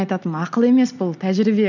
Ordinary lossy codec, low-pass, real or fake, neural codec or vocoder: none; 7.2 kHz; real; none